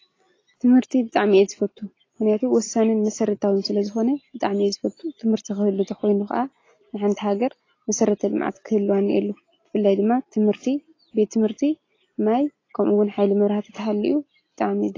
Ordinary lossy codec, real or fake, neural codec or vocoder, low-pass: AAC, 32 kbps; real; none; 7.2 kHz